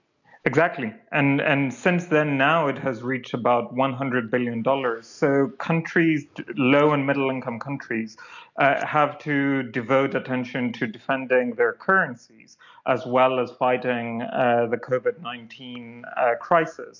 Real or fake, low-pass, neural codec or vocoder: real; 7.2 kHz; none